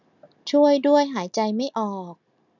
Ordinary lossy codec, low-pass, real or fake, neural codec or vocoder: none; 7.2 kHz; real; none